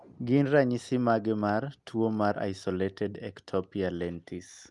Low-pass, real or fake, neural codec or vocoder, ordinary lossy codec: 10.8 kHz; real; none; Opus, 32 kbps